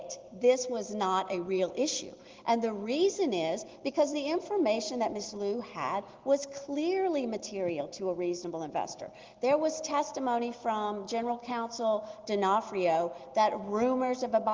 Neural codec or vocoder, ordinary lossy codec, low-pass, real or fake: none; Opus, 16 kbps; 7.2 kHz; real